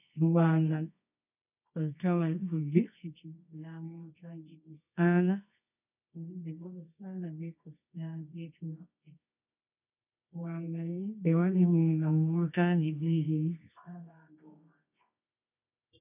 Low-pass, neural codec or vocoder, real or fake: 3.6 kHz; codec, 24 kHz, 0.9 kbps, WavTokenizer, medium music audio release; fake